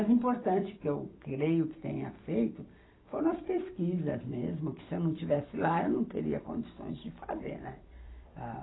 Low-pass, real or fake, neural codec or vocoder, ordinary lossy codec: 7.2 kHz; fake; vocoder, 44.1 kHz, 128 mel bands every 256 samples, BigVGAN v2; AAC, 16 kbps